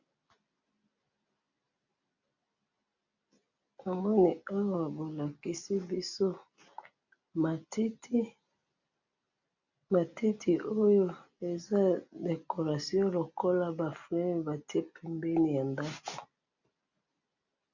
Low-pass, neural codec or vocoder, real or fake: 7.2 kHz; none; real